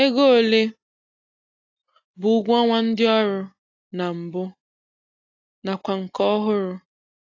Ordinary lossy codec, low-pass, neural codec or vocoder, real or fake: AAC, 32 kbps; 7.2 kHz; none; real